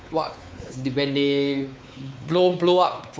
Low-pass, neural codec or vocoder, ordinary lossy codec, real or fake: none; codec, 16 kHz, 4 kbps, X-Codec, WavLM features, trained on Multilingual LibriSpeech; none; fake